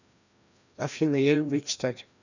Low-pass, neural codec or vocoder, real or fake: 7.2 kHz; codec, 16 kHz, 1 kbps, FreqCodec, larger model; fake